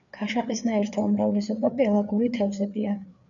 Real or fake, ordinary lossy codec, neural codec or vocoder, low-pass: fake; MP3, 96 kbps; codec, 16 kHz, 4 kbps, FreqCodec, larger model; 7.2 kHz